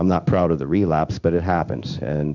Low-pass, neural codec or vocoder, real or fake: 7.2 kHz; codec, 16 kHz in and 24 kHz out, 1 kbps, XY-Tokenizer; fake